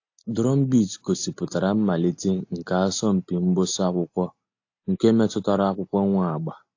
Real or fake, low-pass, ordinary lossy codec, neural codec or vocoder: real; 7.2 kHz; AAC, 48 kbps; none